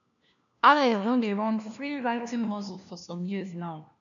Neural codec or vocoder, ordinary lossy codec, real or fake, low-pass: codec, 16 kHz, 1 kbps, FunCodec, trained on LibriTTS, 50 frames a second; MP3, 96 kbps; fake; 7.2 kHz